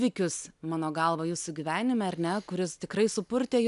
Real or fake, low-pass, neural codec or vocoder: real; 10.8 kHz; none